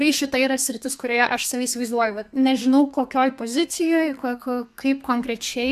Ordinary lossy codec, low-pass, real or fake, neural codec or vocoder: AAC, 96 kbps; 14.4 kHz; fake; codec, 32 kHz, 1.9 kbps, SNAC